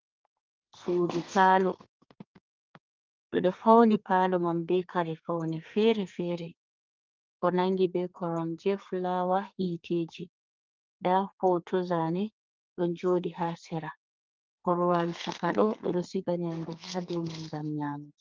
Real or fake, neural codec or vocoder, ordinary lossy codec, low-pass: fake; codec, 32 kHz, 1.9 kbps, SNAC; Opus, 32 kbps; 7.2 kHz